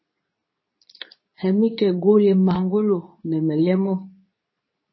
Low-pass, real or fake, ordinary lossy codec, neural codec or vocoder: 7.2 kHz; fake; MP3, 24 kbps; codec, 24 kHz, 0.9 kbps, WavTokenizer, medium speech release version 2